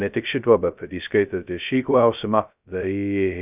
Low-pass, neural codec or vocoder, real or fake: 3.6 kHz; codec, 16 kHz, 0.2 kbps, FocalCodec; fake